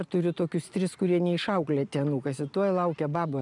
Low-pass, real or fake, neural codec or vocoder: 10.8 kHz; real; none